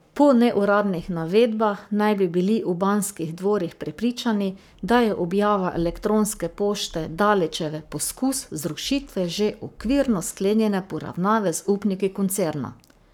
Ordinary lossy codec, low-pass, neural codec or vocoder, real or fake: none; 19.8 kHz; codec, 44.1 kHz, 7.8 kbps, Pupu-Codec; fake